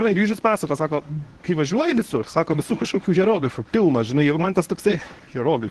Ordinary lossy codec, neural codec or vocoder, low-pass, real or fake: Opus, 16 kbps; codec, 24 kHz, 0.9 kbps, WavTokenizer, medium speech release version 1; 10.8 kHz; fake